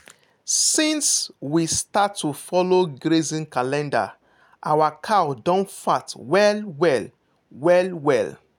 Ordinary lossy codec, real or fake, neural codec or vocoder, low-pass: none; real; none; 19.8 kHz